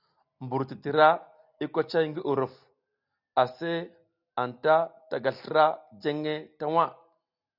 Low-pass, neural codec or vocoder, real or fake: 5.4 kHz; none; real